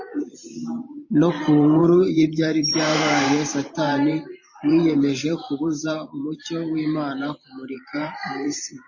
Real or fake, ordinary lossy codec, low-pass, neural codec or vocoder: fake; MP3, 32 kbps; 7.2 kHz; vocoder, 44.1 kHz, 128 mel bands every 512 samples, BigVGAN v2